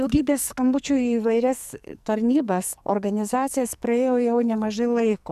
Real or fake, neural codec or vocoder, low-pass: fake; codec, 44.1 kHz, 2.6 kbps, SNAC; 14.4 kHz